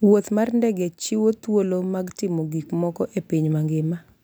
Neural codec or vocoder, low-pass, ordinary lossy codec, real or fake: none; none; none; real